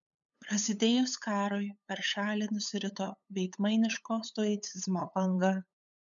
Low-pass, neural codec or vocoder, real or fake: 7.2 kHz; codec, 16 kHz, 8 kbps, FunCodec, trained on LibriTTS, 25 frames a second; fake